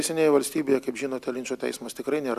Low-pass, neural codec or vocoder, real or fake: 14.4 kHz; none; real